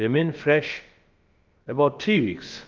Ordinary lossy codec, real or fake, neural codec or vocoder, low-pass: Opus, 32 kbps; fake; codec, 16 kHz, about 1 kbps, DyCAST, with the encoder's durations; 7.2 kHz